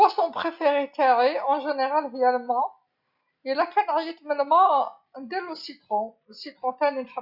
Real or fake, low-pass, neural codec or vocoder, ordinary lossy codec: real; 5.4 kHz; none; Opus, 64 kbps